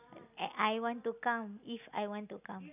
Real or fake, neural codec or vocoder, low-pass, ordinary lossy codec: real; none; 3.6 kHz; none